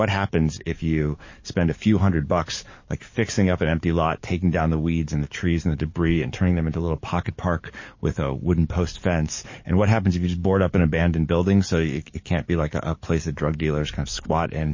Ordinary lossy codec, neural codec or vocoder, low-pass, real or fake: MP3, 32 kbps; codec, 44.1 kHz, 7.8 kbps, DAC; 7.2 kHz; fake